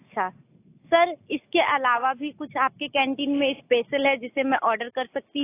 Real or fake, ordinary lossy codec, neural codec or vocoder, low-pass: real; AAC, 24 kbps; none; 3.6 kHz